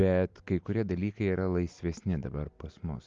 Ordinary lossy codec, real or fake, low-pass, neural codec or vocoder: Opus, 32 kbps; real; 7.2 kHz; none